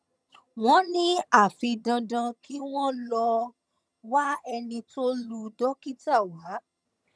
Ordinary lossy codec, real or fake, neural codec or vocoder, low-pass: none; fake; vocoder, 22.05 kHz, 80 mel bands, HiFi-GAN; none